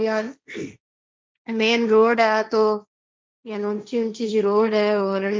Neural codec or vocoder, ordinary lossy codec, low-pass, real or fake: codec, 16 kHz, 1.1 kbps, Voila-Tokenizer; none; none; fake